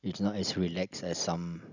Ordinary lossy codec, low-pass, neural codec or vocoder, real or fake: none; 7.2 kHz; none; real